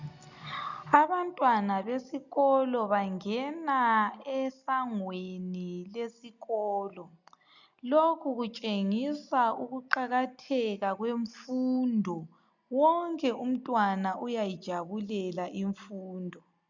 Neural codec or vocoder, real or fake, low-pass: none; real; 7.2 kHz